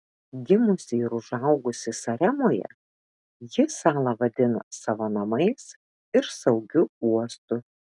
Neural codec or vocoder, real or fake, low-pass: none; real; 10.8 kHz